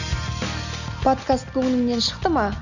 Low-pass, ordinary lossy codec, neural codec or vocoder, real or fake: 7.2 kHz; none; none; real